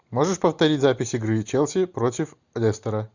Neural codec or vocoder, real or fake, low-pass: none; real; 7.2 kHz